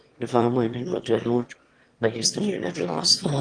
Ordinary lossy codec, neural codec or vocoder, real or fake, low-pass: Opus, 24 kbps; autoencoder, 22.05 kHz, a latent of 192 numbers a frame, VITS, trained on one speaker; fake; 9.9 kHz